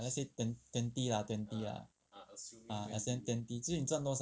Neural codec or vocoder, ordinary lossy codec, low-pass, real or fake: none; none; none; real